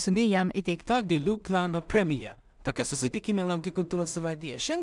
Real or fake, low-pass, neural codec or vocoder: fake; 10.8 kHz; codec, 16 kHz in and 24 kHz out, 0.4 kbps, LongCat-Audio-Codec, two codebook decoder